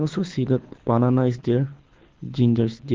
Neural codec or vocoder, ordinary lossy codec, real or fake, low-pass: codec, 24 kHz, 6 kbps, HILCodec; Opus, 16 kbps; fake; 7.2 kHz